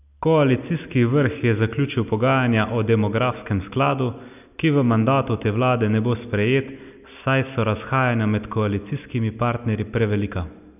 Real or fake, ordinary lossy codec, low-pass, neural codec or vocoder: real; none; 3.6 kHz; none